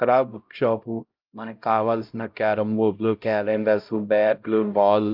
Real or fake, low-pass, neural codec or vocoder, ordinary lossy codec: fake; 5.4 kHz; codec, 16 kHz, 0.5 kbps, X-Codec, HuBERT features, trained on LibriSpeech; Opus, 24 kbps